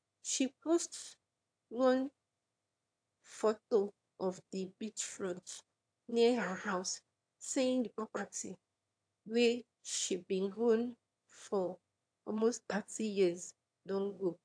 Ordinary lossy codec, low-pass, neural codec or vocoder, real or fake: AAC, 64 kbps; 9.9 kHz; autoencoder, 22.05 kHz, a latent of 192 numbers a frame, VITS, trained on one speaker; fake